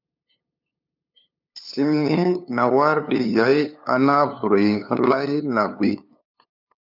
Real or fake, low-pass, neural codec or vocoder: fake; 5.4 kHz; codec, 16 kHz, 2 kbps, FunCodec, trained on LibriTTS, 25 frames a second